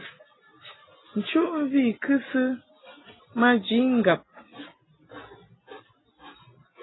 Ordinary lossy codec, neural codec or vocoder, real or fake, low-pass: AAC, 16 kbps; none; real; 7.2 kHz